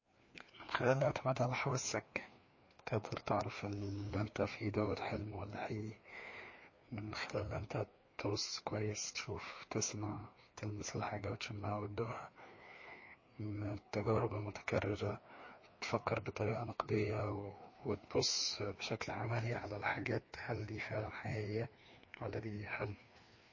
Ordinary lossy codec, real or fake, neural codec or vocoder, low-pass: MP3, 32 kbps; fake; codec, 16 kHz, 2 kbps, FreqCodec, larger model; 7.2 kHz